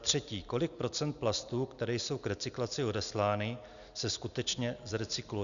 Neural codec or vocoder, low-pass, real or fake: none; 7.2 kHz; real